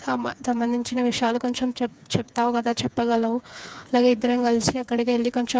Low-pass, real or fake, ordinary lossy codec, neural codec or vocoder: none; fake; none; codec, 16 kHz, 4 kbps, FreqCodec, smaller model